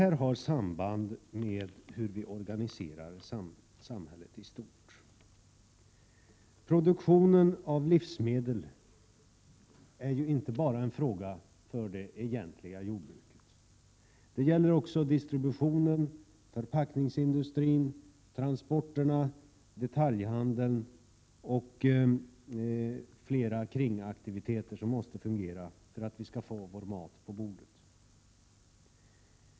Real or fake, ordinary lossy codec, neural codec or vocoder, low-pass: real; none; none; none